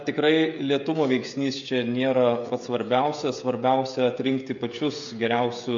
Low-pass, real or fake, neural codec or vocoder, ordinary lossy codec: 7.2 kHz; fake; codec, 16 kHz, 16 kbps, FreqCodec, smaller model; MP3, 48 kbps